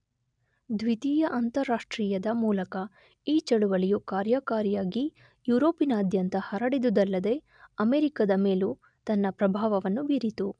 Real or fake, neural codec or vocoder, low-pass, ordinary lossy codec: real; none; 9.9 kHz; none